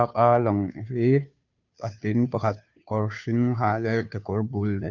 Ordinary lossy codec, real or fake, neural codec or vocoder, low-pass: none; fake; codec, 16 kHz, 2 kbps, FunCodec, trained on Chinese and English, 25 frames a second; 7.2 kHz